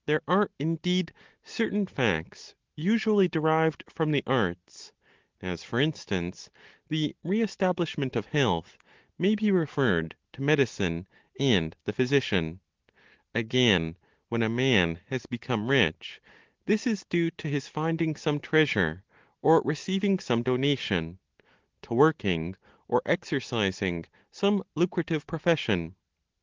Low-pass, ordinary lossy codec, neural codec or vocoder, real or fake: 7.2 kHz; Opus, 16 kbps; none; real